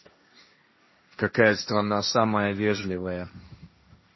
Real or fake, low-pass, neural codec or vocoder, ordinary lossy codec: fake; 7.2 kHz; codec, 16 kHz, 1.1 kbps, Voila-Tokenizer; MP3, 24 kbps